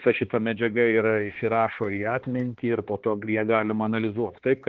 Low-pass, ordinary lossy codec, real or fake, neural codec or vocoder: 7.2 kHz; Opus, 16 kbps; fake; codec, 16 kHz, 2 kbps, X-Codec, HuBERT features, trained on balanced general audio